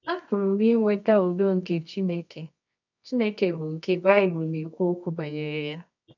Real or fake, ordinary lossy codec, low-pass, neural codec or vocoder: fake; none; 7.2 kHz; codec, 24 kHz, 0.9 kbps, WavTokenizer, medium music audio release